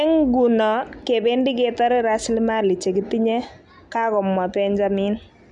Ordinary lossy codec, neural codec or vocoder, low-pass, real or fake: none; none; 10.8 kHz; real